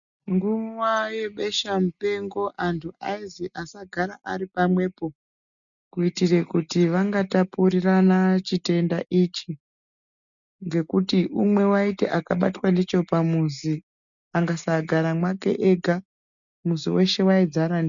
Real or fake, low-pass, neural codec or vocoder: real; 7.2 kHz; none